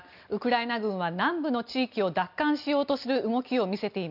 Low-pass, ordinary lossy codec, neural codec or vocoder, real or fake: 5.4 kHz; none; none; real